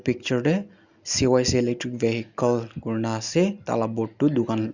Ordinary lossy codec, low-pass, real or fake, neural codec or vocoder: Opus, 64 kbps; 7.2 kHz; real; none